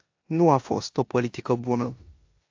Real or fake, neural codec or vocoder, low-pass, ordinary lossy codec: fake; codec, 16 kHz in and 24 kHz out, 0.9 kbps, LongCat-Audio-Codec, four codebook decoder; 7.2 kHz; AAC, 48 kbps